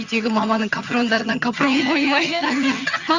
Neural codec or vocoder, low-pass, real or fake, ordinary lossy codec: vocoder, 22.05 kHz, 80 mel bands, HiFi-GAN; 7.2 kHz; fake; Opus, 64 kbps